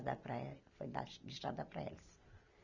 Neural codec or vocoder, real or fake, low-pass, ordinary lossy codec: none; real; 7.2 kHz; none